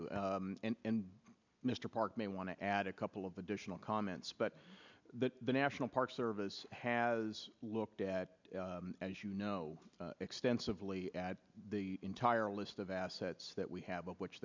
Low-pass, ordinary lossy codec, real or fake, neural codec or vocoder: 7.2 kHz; MP3, 64 kbps; real; none